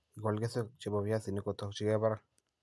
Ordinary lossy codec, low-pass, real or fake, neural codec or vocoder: none; none; real; none